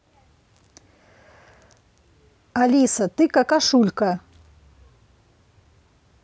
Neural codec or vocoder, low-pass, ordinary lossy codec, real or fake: none; none; none; real